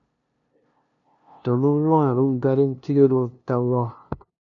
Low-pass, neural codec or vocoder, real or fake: 7.2 kHz; codec, 16 kHz, 0.5 kbps, FunCodec, trained on LibriTTS, 25 frames a second; fake